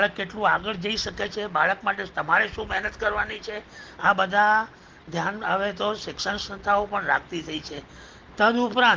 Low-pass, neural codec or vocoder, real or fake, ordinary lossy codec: 7.2 kHz; none; real; Opus, 16 kbps